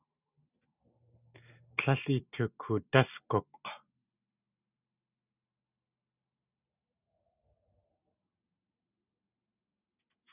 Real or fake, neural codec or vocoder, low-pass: real; none; 3.6 kHz